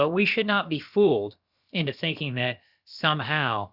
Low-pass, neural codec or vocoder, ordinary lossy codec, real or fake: 5.4 kHz; codec, 16 kHz, about 1 kbps, DyCAST, with the encoder's durations; Opus, 64 kbps; fake